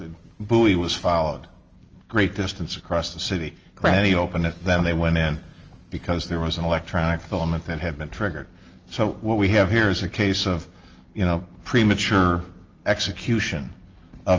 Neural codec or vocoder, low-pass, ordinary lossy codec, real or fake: none; 7.2 kHz; Opus, 24 kbps; real